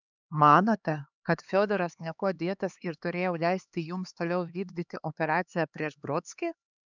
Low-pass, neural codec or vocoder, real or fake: 7.2 kHz; codec, 16 kHz, 2 kbps, X-Codec, HuBERT features, trained on LibriSpeech; fake